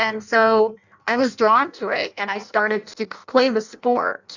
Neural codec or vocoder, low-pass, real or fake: codec, 16 kHz in and 24 kHz out, 0.6 kbps, FireRedTTS-2 codec; 7.2 kHz; fake